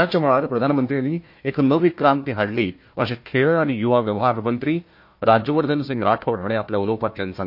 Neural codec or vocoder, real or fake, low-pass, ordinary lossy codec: codec, 16 kHz, 1 kbps, FunCodec, trained on Chinese and English, 50 frames a second; fake; 5.4 kHz; MP3, 32 kbps